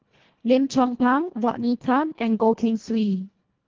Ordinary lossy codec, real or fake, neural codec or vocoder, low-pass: Opus, 16 kbps; fake; codec, 24 kHz, 1.5 kbps, HILCodec; 7.2 kHz